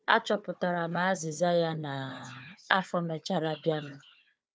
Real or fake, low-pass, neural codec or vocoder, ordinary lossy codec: fake; none; codec, 16 kHz, 4 kbps, FunCodec, trained on Chinese and English, 50 frames a second; none